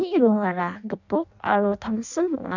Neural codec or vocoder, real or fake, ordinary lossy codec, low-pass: codec, 16 kHz in and 24 kHz out, 0.6 kbps, FireRedTTS-2 codec; fake; none; 7.2 kHz